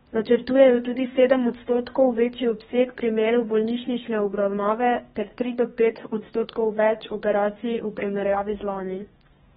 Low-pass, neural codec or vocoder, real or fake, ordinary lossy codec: 14.4 kHz; codec, 32 kHz, 1.9 kbps, SNAC; fake; AAC, 16 kbps